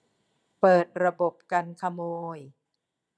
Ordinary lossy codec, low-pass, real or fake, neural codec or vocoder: none; none; fake; vocoder, 22.05 kHz, 80 mel bands, Vocos